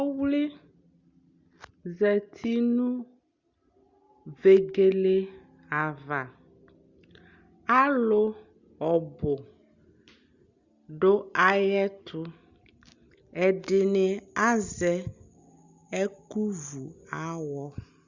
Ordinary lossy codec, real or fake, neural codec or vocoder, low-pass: Opus, 64 kbps; real; none; 7.2 kHz